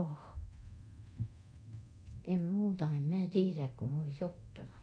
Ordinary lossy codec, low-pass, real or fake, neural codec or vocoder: AAC, 48 kbps; 9.9 kHz; fake; codec, 24 kHz, 0.9 kbps, DualCodec